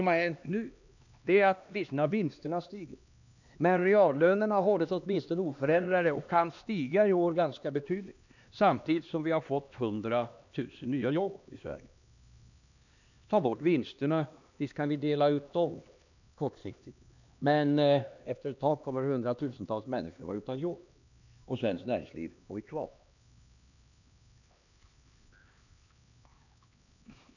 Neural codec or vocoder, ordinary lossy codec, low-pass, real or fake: codec, 16 kHz, 2 kbps, X-Codec, HuBERT features, trained on LibriSpeech; none; 7.2 kHz; fake